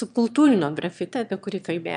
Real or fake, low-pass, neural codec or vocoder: fake; 9.9 kHz; autoencoder, 22.05 kHz, a latent of 192 numbers a frame, VITS, trained on one speaker